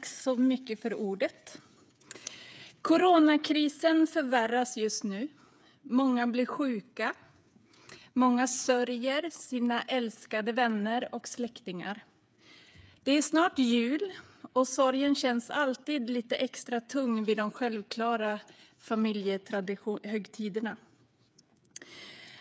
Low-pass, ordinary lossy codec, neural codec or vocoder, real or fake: none; none; codec, 16 kHz, 8 kbps, FreqCodec, smaller model; fake